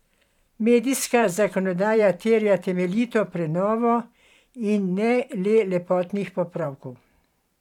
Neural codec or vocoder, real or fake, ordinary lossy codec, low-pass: vocoder, 44.1 kHz, 128 mel bands every 512 samples, BigVGAN v2; fake; none; 19.8 kHz